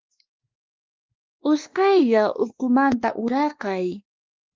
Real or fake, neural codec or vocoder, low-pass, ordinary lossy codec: fake; codec, 16 kHz, 2 kbps, X-Codec, WavLM features, trained on Multilingual LibriSpeech; 7.2 kHz; Opus, 24 kbps